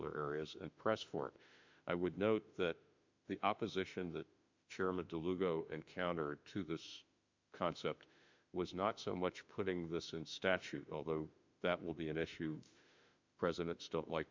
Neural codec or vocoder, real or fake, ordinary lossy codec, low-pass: autoencoder, 48 kHz, 32 numbers a frame, DAC-VAE, trained on Japanese speech; fake; MP3, 64 kbps; 7.2 kHz